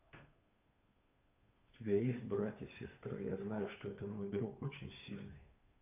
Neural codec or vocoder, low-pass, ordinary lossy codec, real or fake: codec, 16 kHz, 2 kbps, FunCodec, trained on Chinese and English, 25 frames a second; 3.6 kHz; AAC, 32 kbps; fake